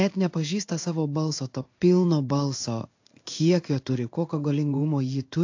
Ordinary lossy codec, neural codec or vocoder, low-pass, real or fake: AAC, 48 kbps; codec, 16 kHz in and 24 kHz out, 1 kbps, XY-Tokenizer; 7.2 kHz; fake